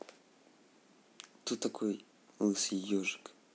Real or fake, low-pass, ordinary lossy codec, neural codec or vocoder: real; none; none; none